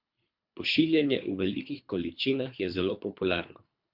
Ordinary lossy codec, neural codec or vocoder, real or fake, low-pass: MP3, 48 kbps; codec, 24 kHz, 3 kbps, HILCodec; fake; 5.4 kHz